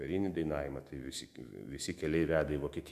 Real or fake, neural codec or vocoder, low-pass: fake; autoencoder, 48 kHz, 128 numbers a frame, DAC-VAE, trained on Japanese speech; 14.4 kHz